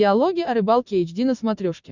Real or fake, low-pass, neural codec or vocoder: real; 7.2 kHz; none